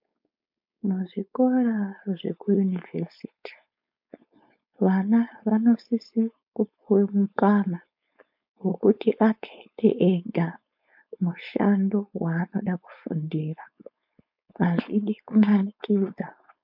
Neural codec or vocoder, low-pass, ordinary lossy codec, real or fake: codec, 16 kHz, 4.8 kbps, FACodec; 5.4 kHz; MP3, 48 kbps; fake